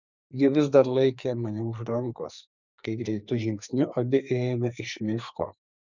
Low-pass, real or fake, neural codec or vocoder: 7.2 kHz; fake; codec, 44.1 kHz, 2.6 kbps, SNAC